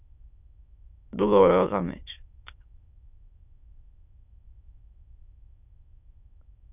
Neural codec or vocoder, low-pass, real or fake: autoencoder, 22.05 kHz, a latent of 192 numbers a frame, VITS, trained on many speakers; 3.6 kHz; fake